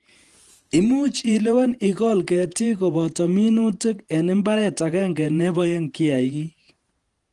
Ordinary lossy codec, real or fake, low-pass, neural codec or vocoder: Opus, 24 kbps; fake; 10.8 kHz; vocoder, 48 kHz, 128 mel bands, Vocos